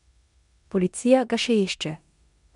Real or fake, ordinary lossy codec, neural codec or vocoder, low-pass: fake; none; codec, 16 kHz in and 24 kHz out, 0.9 kbps, LongCat-Audio-Codec, four codebook decoder; 10.8 kHz